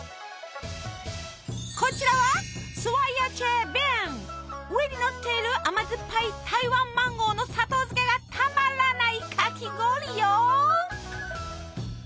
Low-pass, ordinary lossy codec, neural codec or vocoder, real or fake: none; none; none; real